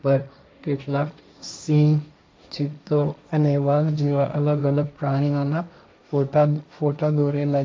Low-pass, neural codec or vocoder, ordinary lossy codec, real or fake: none; codec, 16 kHz, 1.1 kbps, Voila-Tokenizer; none; fake